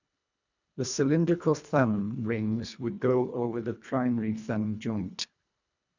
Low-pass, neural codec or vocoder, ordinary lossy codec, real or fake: 7.2 kHz; codec, 24 kHz, 1.5 kbps, HILCodec; none; fake